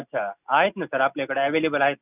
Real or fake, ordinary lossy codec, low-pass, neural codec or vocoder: real; none; 3.6 kHz; none